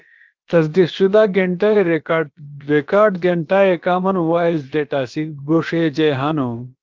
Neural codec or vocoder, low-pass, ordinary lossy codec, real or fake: codec, 16 kHz, about 1 kbps, DyCAST, with the encoder's durations; 7.2 kHz; Opus, 32 kbps; fake